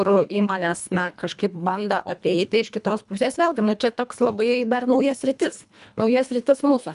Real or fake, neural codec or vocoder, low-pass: fake; codec, 24 kHz, 1.5 kbps, HILCodec; 10.8 kHz